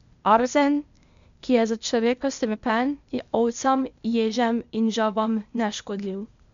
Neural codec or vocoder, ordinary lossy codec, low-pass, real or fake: codec, 16 kHz, 0.8 kbps, ZipCodec; MP3, 64 kbps; 7.2 kHz; fake